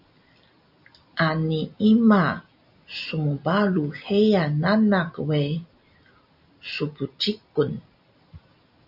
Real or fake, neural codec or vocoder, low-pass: real; none; 5.4 kHz